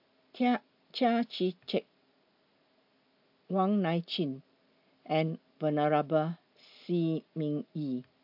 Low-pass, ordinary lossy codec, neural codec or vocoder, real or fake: 5.4 kHz; AAC, 48 kbps; none; real